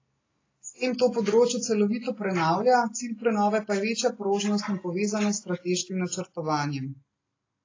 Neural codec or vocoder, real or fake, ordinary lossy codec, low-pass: none; real; AAC, 32 kbps; 7.2 kHz